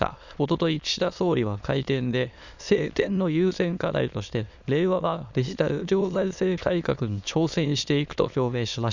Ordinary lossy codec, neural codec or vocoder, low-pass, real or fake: Opus, 64 kbps; autoencoder, 22.05 kHz, a latent of 192 numbers a frame, VITS, trained on many speakers; 7.2 kHz; fake